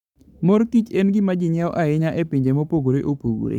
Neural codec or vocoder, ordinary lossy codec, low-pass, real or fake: codec, 44.1 kHz, 7.8 kbps, Pupu-Codec; none; 19.8 kHz; fake